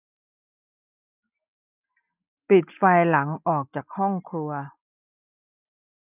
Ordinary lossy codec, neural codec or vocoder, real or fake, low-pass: none; none; real; 3.6 kHz